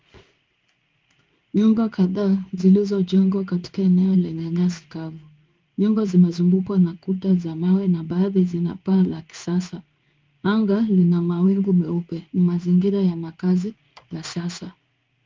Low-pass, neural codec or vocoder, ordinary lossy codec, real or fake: 7.2 kHz; codec, 16 kHz in and 24 kHz out, 1 kbps, XY-Tokenizer; Opus, 32 kbps; fake